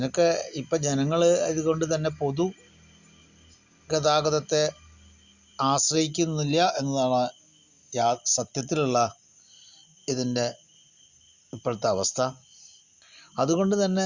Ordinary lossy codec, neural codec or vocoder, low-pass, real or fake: Opus, 64 kbps; none; 7.2 kHz; real